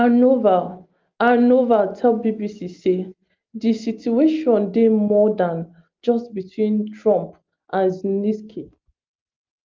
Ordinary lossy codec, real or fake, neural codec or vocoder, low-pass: Opus, 32 kbps; real; none; 7.2 kHz